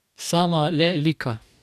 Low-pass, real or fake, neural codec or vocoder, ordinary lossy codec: 14.4 kHz; fake; codec, 44.1 kHz, 2.6 kbps, DAC; none